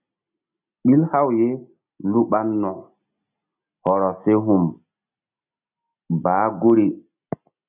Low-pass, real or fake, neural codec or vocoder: 3.6 kHz; real; none